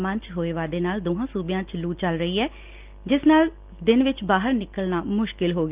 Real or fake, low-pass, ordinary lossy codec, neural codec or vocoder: real; 3.6 kHz; Opus, 32 kbps; none